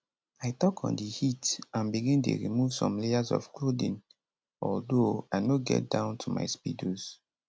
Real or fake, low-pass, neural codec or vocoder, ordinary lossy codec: real; none; none; none